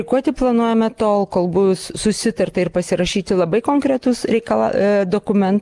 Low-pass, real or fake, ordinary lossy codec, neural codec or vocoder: 10.8 kHz; real; Opus, 24 kbps; none